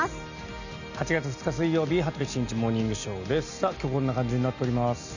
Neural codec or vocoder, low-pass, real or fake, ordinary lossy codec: none; 7.2 kHz; real; none